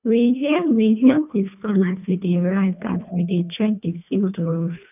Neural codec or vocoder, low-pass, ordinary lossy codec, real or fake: codec, 24 kHz, 1.5 kbps, HILCodec; 3.6 kHz; none; fake